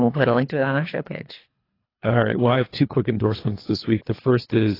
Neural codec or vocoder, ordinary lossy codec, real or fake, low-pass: codec, 24 kHz, 3 kbps, HILCodec; AAC, 24 kbps; fake; 5.4 kHz